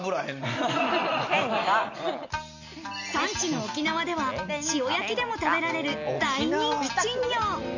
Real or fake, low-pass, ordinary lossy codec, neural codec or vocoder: real; 7.2 kHz; none; none